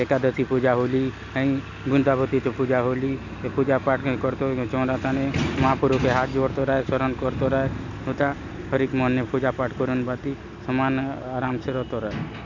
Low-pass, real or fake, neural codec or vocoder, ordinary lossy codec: 7.2 kHz; real; none; none